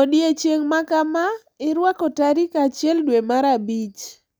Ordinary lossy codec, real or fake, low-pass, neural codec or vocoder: none; real; none; none